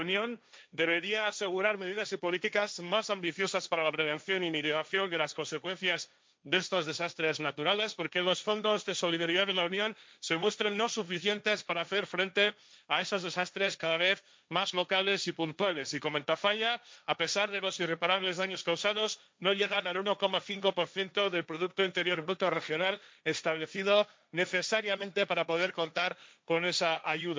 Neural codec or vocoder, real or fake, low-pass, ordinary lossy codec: codec, 16 kHz, 1.1 kbps, Voila-Tokenizer; fake; none; none